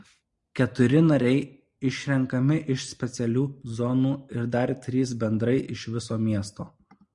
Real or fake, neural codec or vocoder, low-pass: real; none; 10.8 kHz